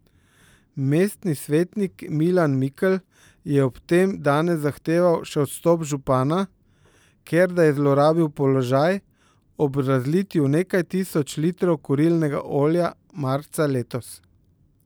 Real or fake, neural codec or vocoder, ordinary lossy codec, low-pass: fake; vocoder, 44.1 kHz, 128 mel bands every 512 samples, BigVGAN v2; none; none